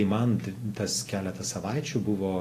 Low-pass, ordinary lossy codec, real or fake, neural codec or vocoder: 14.4 kHz; AAC, 48 kbps; real; none